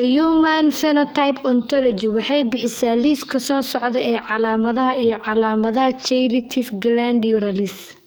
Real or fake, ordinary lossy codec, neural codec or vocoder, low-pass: fake; none; codec, 44.1 kHz, 2.6 kbps, SNAC; none